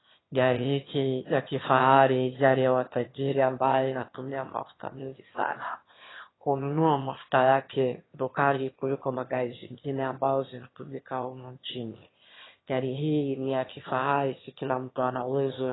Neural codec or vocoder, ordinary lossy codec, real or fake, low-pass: autoencoder, 22.05 kHz, a latent of 192 numbers a frame, VITS, trained on one speaker; AAC, 16 kbps; fake; 7.2 kHz